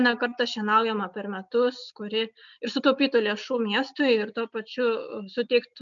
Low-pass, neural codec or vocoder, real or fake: 7.2 kHz; none; real